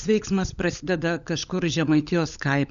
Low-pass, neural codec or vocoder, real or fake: 7.2 kHz; codec, 16 kHz, 16 kbps, FunCodec, trained on LibriTTS, 50 frames a second; fake